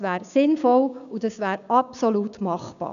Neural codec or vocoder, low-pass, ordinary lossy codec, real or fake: codec, 16 kHz, 6 kbps, DAC; 7.2 kHz; none; fake